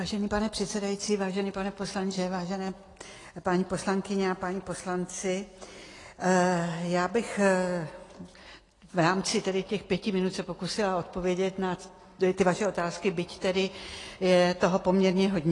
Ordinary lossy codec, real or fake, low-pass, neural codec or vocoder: AAC, 32 kbps; real; 10.8 kHz; none